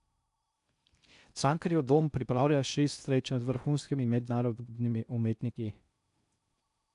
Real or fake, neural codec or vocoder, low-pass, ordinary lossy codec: fake; codec, 16 kHz in and 24 kHz out, 0.6 kbps, FocalCodec, streaming, 4096 codes; 10.8 kHz; none